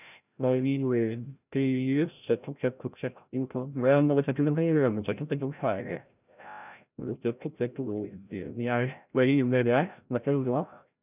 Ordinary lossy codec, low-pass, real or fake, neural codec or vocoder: none; 3.6 kHz; fake; codec, 16 kHz, 0.5 kbps, FreqCodec, larger model